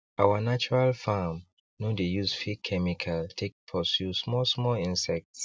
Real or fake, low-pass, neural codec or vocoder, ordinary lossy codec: real; none; none; none